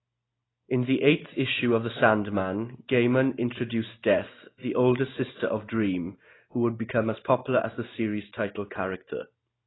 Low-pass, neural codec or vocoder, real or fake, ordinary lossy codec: 7.2 kHz; none; real; AAC, 16 kbps